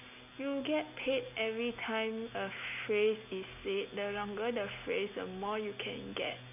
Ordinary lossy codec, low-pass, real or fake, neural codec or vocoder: none; 3.6 kHz; real; none